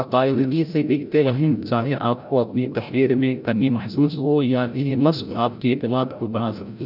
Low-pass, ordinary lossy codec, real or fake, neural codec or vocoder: 5.4 kHz; none; fake; codec, 16 kHz, 0.5 kbps, FreqCodec, larger model